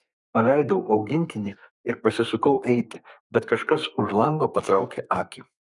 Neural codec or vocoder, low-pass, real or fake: codec, 32 kHz, 1.9 kbps, SNAC; 10.8 kHz; fake